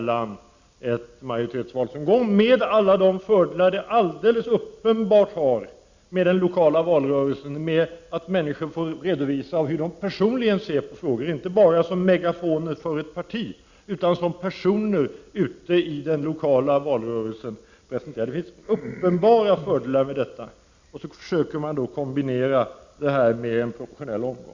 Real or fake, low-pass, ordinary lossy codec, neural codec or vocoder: real; 7.2 kHz; Opus, 64 kbps; none